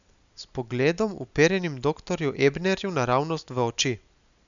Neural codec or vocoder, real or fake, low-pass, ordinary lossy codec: none; real; 7.2 kHz; none